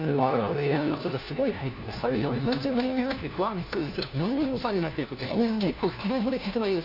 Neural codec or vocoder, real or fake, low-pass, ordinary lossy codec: codec, 16 kHz, 1 kbps, FunCodec, trained on LibriTTS, 50 frames a second; fake; 5.4 kHz; none